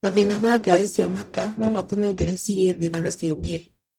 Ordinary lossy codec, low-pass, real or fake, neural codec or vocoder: none; 19.8 kHz; fake; codec, 44.1 kHz, 0.9 kbps, DAC